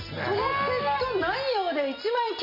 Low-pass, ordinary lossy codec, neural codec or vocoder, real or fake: 5.4 kHz; MP3, 32 kbps; none; real